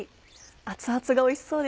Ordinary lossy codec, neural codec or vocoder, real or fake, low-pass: none; none; real; none